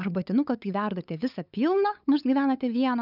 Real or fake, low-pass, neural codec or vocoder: fake; 5.4 kHz; codec, 16 kHz, 8 kbps, FunCodec, trained on LibriTTS, 25 frames a second